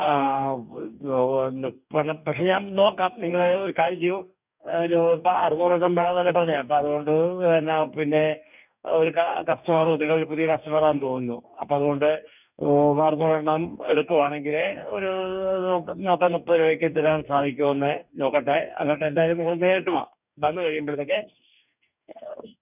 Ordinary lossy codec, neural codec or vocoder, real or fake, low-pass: none; codec, 44.1 kHz, 2.6 kbps, DAC; fake; 3.6 kHz